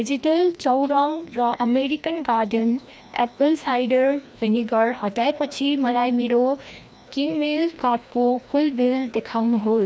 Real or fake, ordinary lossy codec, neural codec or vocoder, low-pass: fake; none; codec, 16 kHz, 1 kbps, FreqCodec, larger model; none